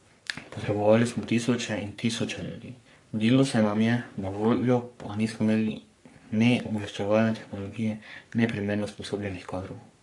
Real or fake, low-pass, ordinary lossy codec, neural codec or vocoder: fake; 10.8 kHz; AAC, 64 kbps; codec, 44.1 kHz, 3.4 kbps, Pupu-Codec